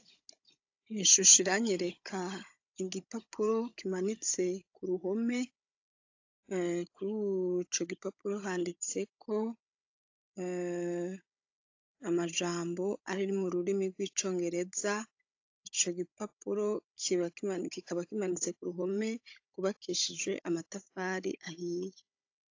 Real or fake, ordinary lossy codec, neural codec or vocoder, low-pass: fake; AAC, 48 kbps; codec, 16 kHz, 16 kbps, FunCodec, trained on Chinese and English, 50 frames a second; 7.2 kHz